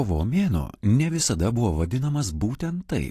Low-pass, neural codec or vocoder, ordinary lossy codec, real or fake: 14.4 kHz; none; AAC, 48 kbps; real